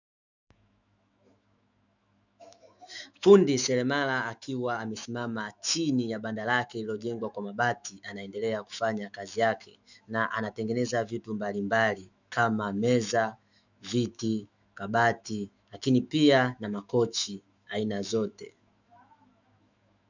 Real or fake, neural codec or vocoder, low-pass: fake; autoencoder, 48 kHz, 128 numbers a frame, DAC-VAE, trained on Japanese speech; 7.2 kHz